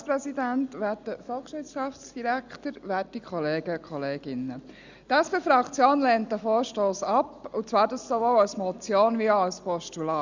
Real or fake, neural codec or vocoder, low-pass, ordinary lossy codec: real; none; 7.2 kHz; Opus, 64 kbps